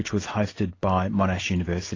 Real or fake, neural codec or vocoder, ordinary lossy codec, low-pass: real; none; AAC, 32 kbps; 7.2 kHz